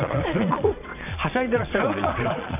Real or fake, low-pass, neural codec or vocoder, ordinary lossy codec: fake; 3.6 kHz; vocoder, 22.05 kHz, 80 mel bands, Vocos; none